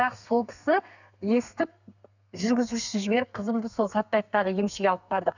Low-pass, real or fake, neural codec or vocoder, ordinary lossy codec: 7.2 kHz; fake; codec, 32 kHz, 1.9 kbps, SNAC; none